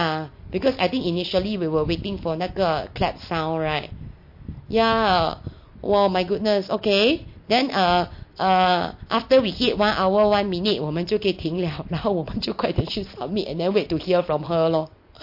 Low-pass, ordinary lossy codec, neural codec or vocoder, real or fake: 5.4 kHz; MP3, 32 kbps; none; real